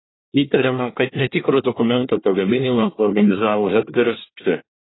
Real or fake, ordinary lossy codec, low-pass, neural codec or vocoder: fake; AAC, 16 kbps; 7.2 kHz; codec, 24 kHz, 1 kbps, SNAC